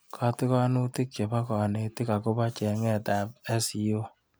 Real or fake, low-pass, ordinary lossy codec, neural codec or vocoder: real; none; none; none